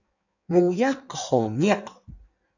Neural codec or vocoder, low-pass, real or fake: codec, 16 kHz in and 24 kHz out, 1.1 kbps, FireRedTTS-2 codec; 7.2 kHz; fake